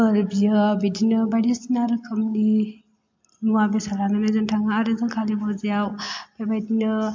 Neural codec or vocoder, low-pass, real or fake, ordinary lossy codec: none; 7.2 kHz; real; MP3, 48 kbps